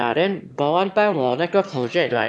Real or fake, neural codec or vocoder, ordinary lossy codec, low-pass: fake; autoencoder, 22.05 kHz, a latent of 192 numbers a frame, VITS, trained on one speaker; none; none